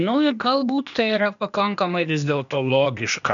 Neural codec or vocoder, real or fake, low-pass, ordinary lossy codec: codec, 16 kHz, 0.8 kbps, ZipCodec; fake; 7.2 kHz; MP3, 96 kbps